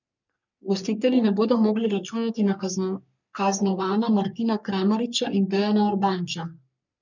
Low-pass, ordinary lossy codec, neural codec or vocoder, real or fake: 7.2 kHz; none; codec, 44.1 kHz, 3.4 kbps, Pupu-Codec; fake